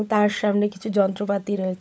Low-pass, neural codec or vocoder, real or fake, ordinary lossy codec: none; codec, 16 kHz, 16 kbps, FunCodec, trained on LibriTTS, 50 frames a second; fake; none